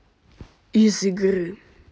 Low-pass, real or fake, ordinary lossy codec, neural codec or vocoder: none; real; none; none